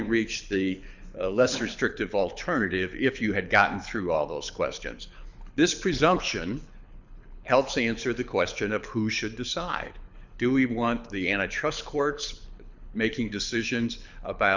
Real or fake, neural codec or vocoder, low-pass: fake; codec, 24 kHz, 6 kbps, HILCodec; 7.2 kHz